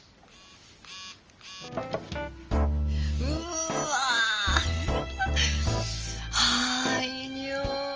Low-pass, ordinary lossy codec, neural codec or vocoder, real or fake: 7.2 kHz; Opus, 24 kbps; none; real